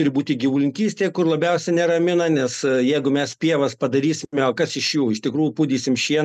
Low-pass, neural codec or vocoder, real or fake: 14.4 kHz; none; real